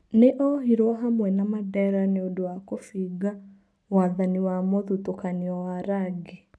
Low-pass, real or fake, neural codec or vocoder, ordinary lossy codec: none; real; none; none